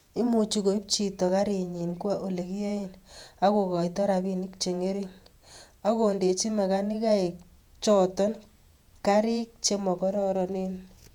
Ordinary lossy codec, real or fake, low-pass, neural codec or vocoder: none; fake; 19.8 kHz; vocoder, 48 kHz, 128 mel bands, Vocos